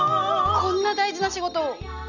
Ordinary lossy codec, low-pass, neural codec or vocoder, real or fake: none; 7.2 kHz; none; real